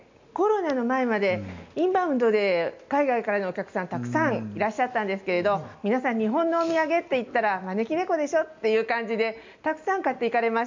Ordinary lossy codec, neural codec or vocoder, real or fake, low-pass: MP3, 64 kbps; none; real; 7.2 kHz